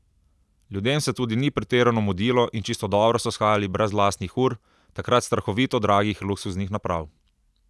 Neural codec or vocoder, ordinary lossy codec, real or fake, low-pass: none; none; real; none